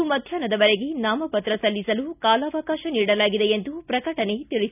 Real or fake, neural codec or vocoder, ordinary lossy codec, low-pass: real; none; none; 3.6 kHz